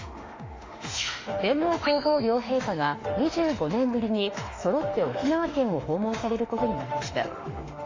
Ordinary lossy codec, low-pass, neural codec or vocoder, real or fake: AAC, 32 kbps; 7.2 kHz; autoencoder, 48 kHz, 32 numbers a frame, DAC-VAE, trained on Japanese speech; fake